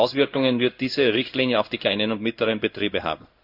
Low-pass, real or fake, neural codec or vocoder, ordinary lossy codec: 5.4 kHz; fake; codec, 16 kHz in and 24 kHz out, 1 kbps, XY-Tokenizer; none